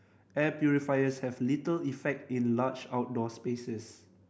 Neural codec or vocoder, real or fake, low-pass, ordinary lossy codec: none; real; none; none